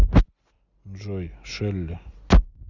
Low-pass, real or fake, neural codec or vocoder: 7.2 kHz; real; none